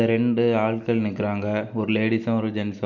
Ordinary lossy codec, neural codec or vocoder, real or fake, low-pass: none; none; real; 7.2 kHz